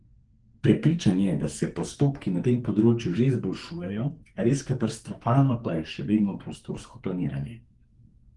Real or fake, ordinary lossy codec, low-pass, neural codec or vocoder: fake; Opus, 24 kbps; 10.8 kHz; codec, 44.1 kHz, 2.6 kbps, SNAC